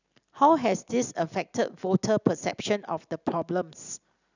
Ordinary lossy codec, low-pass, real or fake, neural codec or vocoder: none; 7.2 kHz; real; none